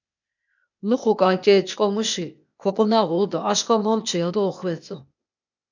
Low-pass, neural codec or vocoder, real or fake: 7.2 kHz; codec, 16 kHz, 0.8 kbps, ZipCodec; fake